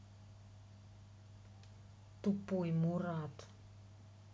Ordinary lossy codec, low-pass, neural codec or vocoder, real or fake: none; none; none; real